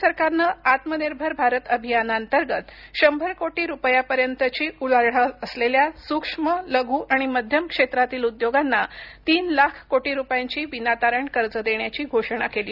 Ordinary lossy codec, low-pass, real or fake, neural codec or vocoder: none; 5.4 kHz; real; none